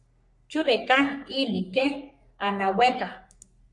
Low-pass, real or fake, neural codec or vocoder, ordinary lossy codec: 10.8 kHz; fake; codec, 44.1 kHz, 3.4 kbps, Pupu-Codec; MP3, 64 kbps